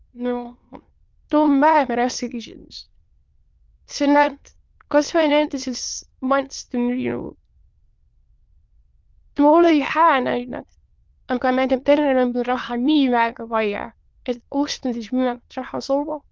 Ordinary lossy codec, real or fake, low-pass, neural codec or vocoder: Opus, 32 kbps; fake; 7.2 kHz; autoencoder, 22.05 kHz, a latent of 192 numbers a frame, VITS, trained on many speakers